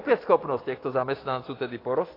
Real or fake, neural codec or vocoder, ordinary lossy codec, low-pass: real; none; AAC, 24 kbps; 5.4 kHz